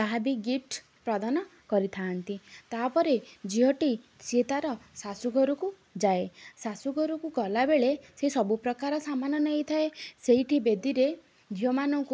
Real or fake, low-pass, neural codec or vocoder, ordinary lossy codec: real; none; none; none